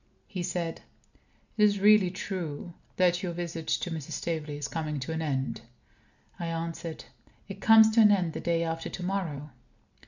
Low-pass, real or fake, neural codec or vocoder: 7.2 kHz; real; none